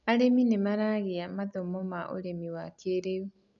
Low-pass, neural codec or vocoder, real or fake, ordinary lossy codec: 7.2 kHz; none; real; none